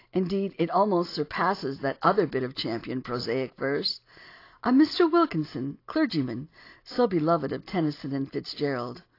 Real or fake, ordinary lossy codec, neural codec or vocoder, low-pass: real; AAC, 32 kbps; none; 5.4 kHz